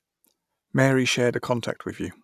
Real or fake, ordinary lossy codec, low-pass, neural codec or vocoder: real; none; 14.4 kHz; none